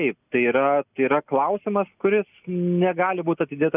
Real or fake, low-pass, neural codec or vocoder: real; 3.6 kHz; none